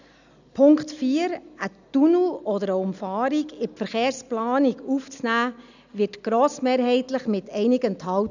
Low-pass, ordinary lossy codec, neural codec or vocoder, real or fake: 7.2 kHz; none; none; real